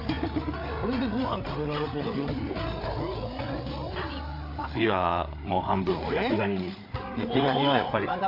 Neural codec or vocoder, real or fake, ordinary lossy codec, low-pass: codec, 16 kHz, 8 kbps, FreqCodec, larger model; fake; AAC, 32 kbps; 5.4 kHz